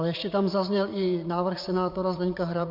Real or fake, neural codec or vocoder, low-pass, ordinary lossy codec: fake; vocoder, 44.1 kHz, 80 mel bands, Vocos; 5.4 kHz; MP3, 48 kbps